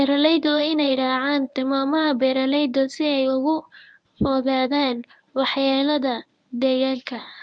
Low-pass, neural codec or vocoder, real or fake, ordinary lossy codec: 9.9 kHz; codec, 24 kHz, 0.9 kbps, WavTokenizer, medium speech release version 1; fake; none